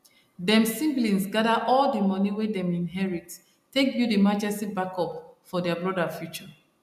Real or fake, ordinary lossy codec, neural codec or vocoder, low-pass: real; MP3, 96 kbps; none; 14.4 kHz